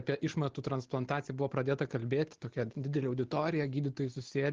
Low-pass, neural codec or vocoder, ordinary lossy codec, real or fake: 7.2 kHz; none; Opus, 16 kbps; real